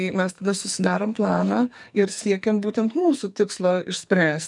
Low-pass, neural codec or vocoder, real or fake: 10.8 kHz; codec, 44.1 kHz, 2.6 kbps, SNAC; fake